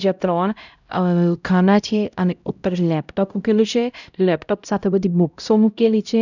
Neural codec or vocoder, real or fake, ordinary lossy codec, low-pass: codec, 16 kHz, 0.5 kbps, X-Codec, HuBERT features, trained on LibriSpeech; fake; none; 7.2 kHz